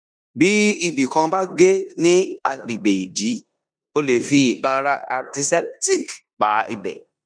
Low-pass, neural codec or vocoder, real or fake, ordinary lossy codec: 9.9 kHz; codec, 16 kHz in and 24 kHz out, 0.9 kbps, LongCat-Audio-Codec, four codebook decoder; fake; none